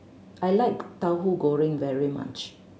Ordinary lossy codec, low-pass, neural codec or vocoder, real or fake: none; none; none; real